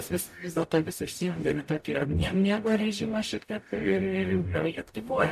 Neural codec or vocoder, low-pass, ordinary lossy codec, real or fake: codec, 44.1 kHz, 0.9 kbps, DAC; 14.4 kHz; AAC, 96 kbps; fake